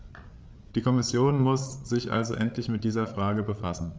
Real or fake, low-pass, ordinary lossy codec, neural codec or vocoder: fake; none; none; codec, 16 kHz, 8 kbps, FreqCodec, larger model